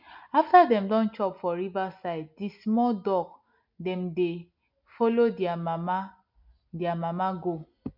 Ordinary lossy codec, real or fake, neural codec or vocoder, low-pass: MP3, 48 kbps; real; none; 5.4 kHz